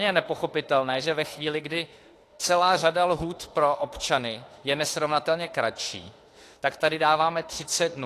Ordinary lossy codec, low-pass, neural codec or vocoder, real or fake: AAC, 48 kbps; 14.4 kHz; autoencoder, 48 kHz, 32 numbers a frame, DAC-VAE, trained on Japanese speech; fake